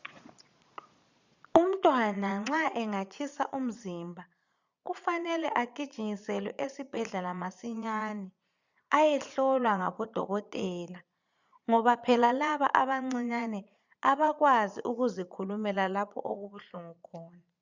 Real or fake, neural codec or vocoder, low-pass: fake; vocoder, 22.05 kHz, 80 mel bands, Vocos; 7.2 kHz